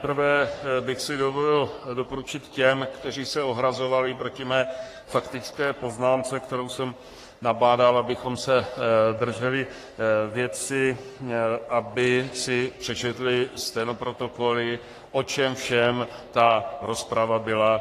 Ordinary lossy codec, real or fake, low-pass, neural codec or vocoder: AAC, 48 kbps; fake; 14.4 kHz; codec, 44.1 kHz, 3.4 kbps, Pupu-Codec